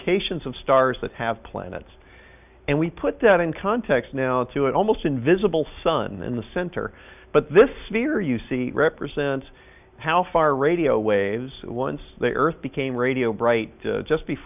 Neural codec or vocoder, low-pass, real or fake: none; 3.6 kHz; real